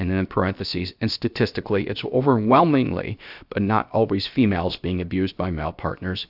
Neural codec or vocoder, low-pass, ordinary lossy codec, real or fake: codec, 16 kHz, 0.7 kbps, FocalCodec; 5.4 kHz; AAC, 48 kbps; fake